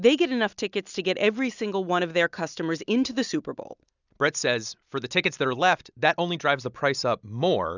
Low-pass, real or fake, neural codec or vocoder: 7.2 kHz; real; none